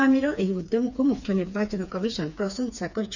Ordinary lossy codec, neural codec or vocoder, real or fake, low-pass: none; codec, 16 kHz, 4 kbps, FreqCodec, smaller model; fake; 7.2 kHz